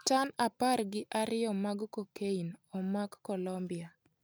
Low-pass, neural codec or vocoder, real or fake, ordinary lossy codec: none; none; real; none